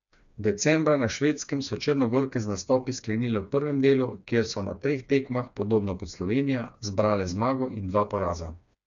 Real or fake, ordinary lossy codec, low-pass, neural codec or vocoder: fake; none; 7.2 kHz; codec, 16 kHz, 2 kbps, FreqCodec, smaller model